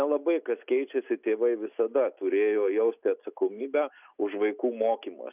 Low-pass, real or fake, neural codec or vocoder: 3.6 kHz; real; none